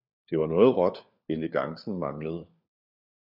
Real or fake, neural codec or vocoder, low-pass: fake; codec, 16 kHz, 4 kbps, FunCodec, trained on LibriTTS, 50 frames a second; 5.4 kHz